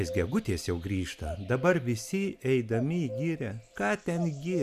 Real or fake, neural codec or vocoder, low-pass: real; none; 14.4 kHz